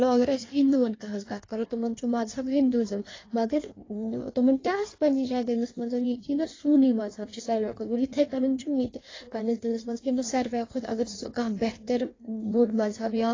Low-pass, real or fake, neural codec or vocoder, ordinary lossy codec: 7.2 kHz; fake; codec, 16 kHz in and 24 kHz out, 1.1 kbps, FireRedTTS-2 codec; AAC, 32 kbps